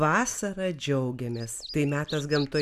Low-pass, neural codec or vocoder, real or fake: 14.4 kHz; none; real